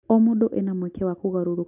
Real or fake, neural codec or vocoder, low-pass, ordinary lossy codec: real; none; 3.6 kHz; none